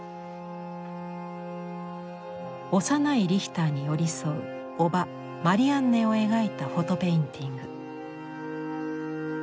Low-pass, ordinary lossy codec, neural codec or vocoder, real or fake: none; none; none; real